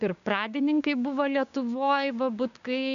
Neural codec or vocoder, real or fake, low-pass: codec, 16 kHz, 6 kbps, DAC; fake; 7.2 kHz